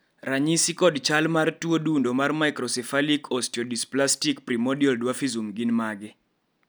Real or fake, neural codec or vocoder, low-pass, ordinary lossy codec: real; none; none; none